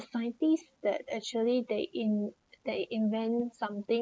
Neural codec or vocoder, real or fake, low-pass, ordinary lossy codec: none; real; none; none